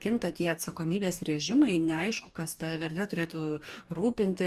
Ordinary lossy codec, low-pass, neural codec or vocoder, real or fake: Opus, 64 kbps; 14.4 kHz; codec, 44.1 kHz, 2.6 kbps, DAC; fake